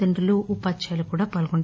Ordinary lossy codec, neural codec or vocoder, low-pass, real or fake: none; none; none; real